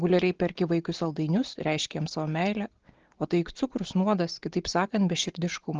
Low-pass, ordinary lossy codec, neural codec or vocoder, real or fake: 7.2 kHz; Opus, 16 kbps; none; real